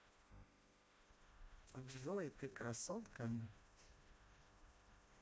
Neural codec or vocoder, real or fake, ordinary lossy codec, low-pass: codec, 16 kHz, 1 kbps, FreqCodec, smaller model; fake; none; none